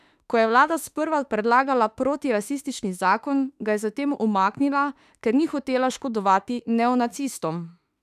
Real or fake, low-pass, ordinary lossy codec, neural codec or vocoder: fake; 14.4 kHz; none; autoencoder, 48 kHz, 32 numbers a frame, DAC-VAE, trained on Japanese speech